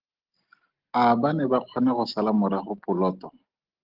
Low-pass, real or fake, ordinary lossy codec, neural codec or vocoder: 5.4 kHz; real; Opus, 16 kbps; none